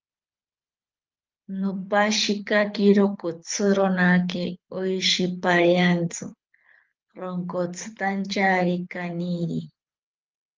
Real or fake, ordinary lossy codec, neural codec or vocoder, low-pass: fake; Opus, 32 kbps; codec, 24 kHz, 6 kbps, HILCodec; 7.2 kHz